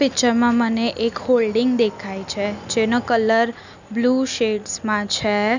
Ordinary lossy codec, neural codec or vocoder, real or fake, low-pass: none; none; real; 7.2 kHz